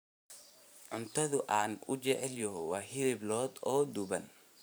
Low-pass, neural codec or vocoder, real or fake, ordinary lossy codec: none; vocoder, 44.1 kHz, 128 mel bands every 512 samples, BigVGAN v2; fake; none